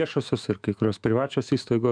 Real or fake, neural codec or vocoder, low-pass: fake; autoencoder, 48 kHz, 128 numbers a frame, DAC-VAE, trained on Japanese speech; 9.9 kHz